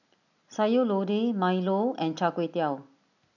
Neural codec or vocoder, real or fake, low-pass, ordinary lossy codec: none; real; 7.2 kHz; none